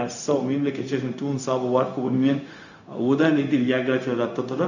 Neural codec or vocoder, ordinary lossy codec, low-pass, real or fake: codec, 16 kHz, 0.4 kbps, LongCat-Audio-Codec; none; 7.2 kHz; fake